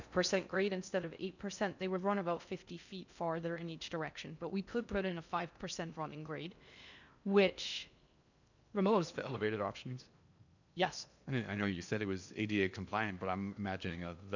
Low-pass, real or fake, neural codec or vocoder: 7.2 kHz; fake; codec, 16 kHz in and 24 kHz out, 0.6 kbps, FocalCodec, streaming, 4096 codes